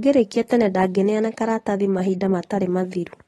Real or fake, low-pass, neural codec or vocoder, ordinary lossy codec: real; 19.8 kHz; none; AAC, 32 kbps